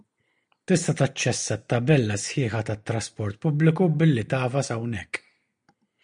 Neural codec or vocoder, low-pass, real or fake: none; 9.9 kHz; real